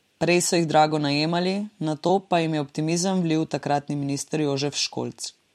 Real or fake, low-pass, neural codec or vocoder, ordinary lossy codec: fake; 19.8 kHz; vocoder, 44.1 kHz, 128 mel bands every 256 samples, BigVGAN v2; MP3, 64 kbps